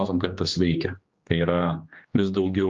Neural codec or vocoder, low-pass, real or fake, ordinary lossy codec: codec, 16 kHz, 2 kbps, X-Codec, HuBERT features, trained on general audio; 7.2 kHz; fake; Opus, 32 kbps